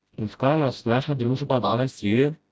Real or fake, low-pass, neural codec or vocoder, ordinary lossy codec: fake; none; codec, 16 kHz, 0.5 kbps, FreqCodec, smaller model; none